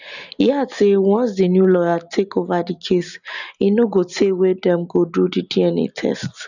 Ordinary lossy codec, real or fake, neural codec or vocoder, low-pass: none; real; none; 7.2 kHz